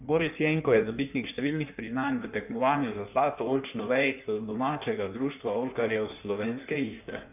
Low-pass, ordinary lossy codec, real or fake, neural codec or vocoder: 3.6 kHz; none; fake; codec, 16 kHz in and 24 kHz out, 1.1 kbps, FireRedTTS-2 codec